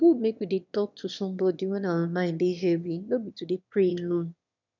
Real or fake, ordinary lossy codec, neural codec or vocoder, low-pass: fake; none; autoencoder, 22.05 kHz, a latent of 192 numbers a frame, VITS, trained on one speaker; 7.2 kHz